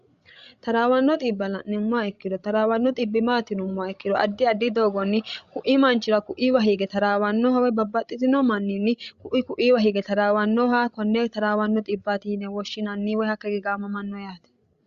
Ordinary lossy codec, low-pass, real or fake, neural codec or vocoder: Opus, 64 kbps; 7.2 kHz; fake; codec, 16 kHz, 16 kbps, FreqCodec, larger model